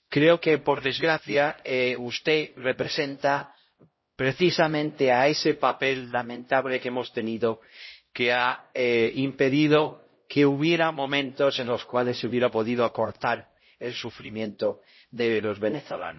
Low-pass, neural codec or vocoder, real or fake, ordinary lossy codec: 7.2 kHz; codec, 16 kHz, 0.5 kbps, X-Codec, HuBERT features, trained on LibriSpeech; fake; MP3, 24 kbps